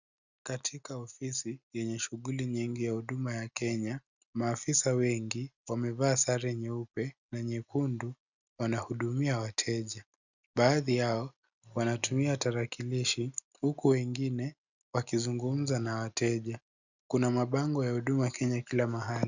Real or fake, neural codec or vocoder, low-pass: real; none; 7.2 kHz